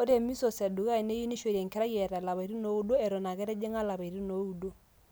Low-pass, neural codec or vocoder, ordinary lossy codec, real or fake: none; none; none; real